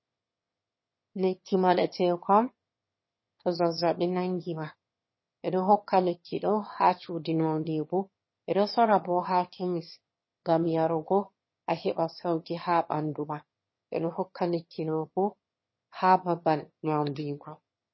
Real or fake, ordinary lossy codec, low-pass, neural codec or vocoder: fake; MP3, 24 kbps; 7.2 kHz; autoencoder, 22.05 kHz, a latent of 192 numbers a frame, VITS, trained on one speaker